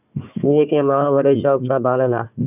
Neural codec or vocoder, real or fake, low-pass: codec, 16 kHz, 1 kbps, FunCodec, trained on Chinese and English, 50 frames a second; fake; 3.6 kHz